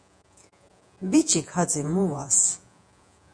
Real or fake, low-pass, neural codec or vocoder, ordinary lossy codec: fake; 9.9 kHz; vocoder, 48 kHz, 128 mel bands, Vocos; MP3, 96 kbps